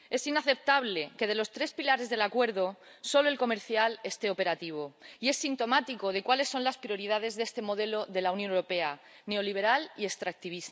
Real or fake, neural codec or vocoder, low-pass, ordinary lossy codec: real; none; none; none